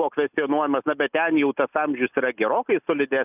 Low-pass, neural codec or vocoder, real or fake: 3.6 kHz; none; real